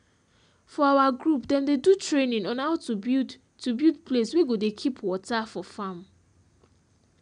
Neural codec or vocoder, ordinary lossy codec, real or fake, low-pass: none; none; real; 9.9 kHz